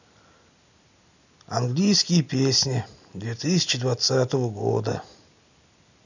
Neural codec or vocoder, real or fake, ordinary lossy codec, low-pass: none; real; none; 7.2 kHz